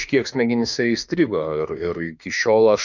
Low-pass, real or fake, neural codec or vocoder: 7.2 kHz; fake; autoencoder, 48 kHz, 32 numbers a frame, DAC-VAE, trained on Japanese speech